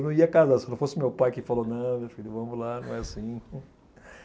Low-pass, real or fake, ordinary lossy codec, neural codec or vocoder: none; real; none; none